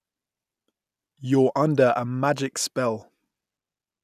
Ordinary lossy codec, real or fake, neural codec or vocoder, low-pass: none; real; none; 14.4 kHz